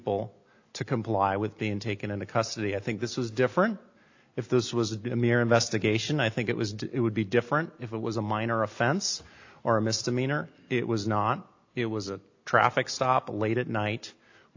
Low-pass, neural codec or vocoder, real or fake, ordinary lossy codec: 7.2 kHz; none; real; AAC, 48 kbps